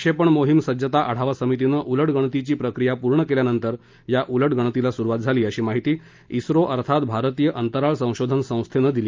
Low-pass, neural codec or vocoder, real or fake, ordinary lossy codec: 7.2 kHz; none; real; Opus, 24 kbps